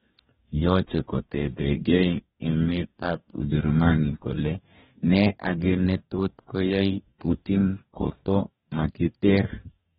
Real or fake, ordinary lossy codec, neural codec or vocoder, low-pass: fake; AAC, 16 kbps; codec, 44.1 kHz, 2.6 kbps, DAC; 19.8 kHz